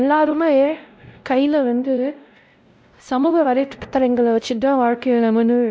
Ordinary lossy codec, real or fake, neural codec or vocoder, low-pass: none; fake; codec, 16 kHz, 0.5 kbps, X-Codec, WavLM features, trained on Multilingual LibriSpeech; none